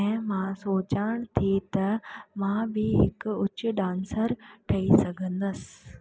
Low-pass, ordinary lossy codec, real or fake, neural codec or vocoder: none; none; real; none